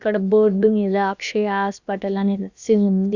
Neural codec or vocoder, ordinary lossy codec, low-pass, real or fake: codec, 16 kHz, about 1 kbps, DyCAST, with the encoder's durations; Opus, 64 kbps; 7.2 kHz; fake